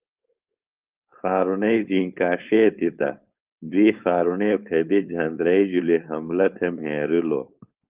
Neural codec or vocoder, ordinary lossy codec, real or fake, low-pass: codec, 16 kHz, 4.8 kbps, FACodec; Opus, 32 kbps; fake; 3.6 kHz